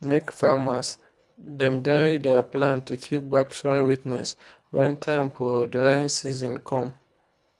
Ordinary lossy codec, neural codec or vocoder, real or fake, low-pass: none; codec, 24 kHz, 1.5 kbps, HILCodec; fake; none